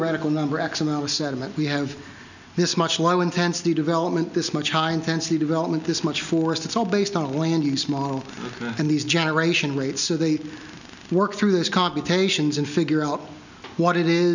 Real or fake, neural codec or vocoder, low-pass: real; none; 7.2 kHz